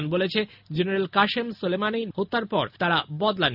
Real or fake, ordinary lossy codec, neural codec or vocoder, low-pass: real; none; none; 5.4 kHz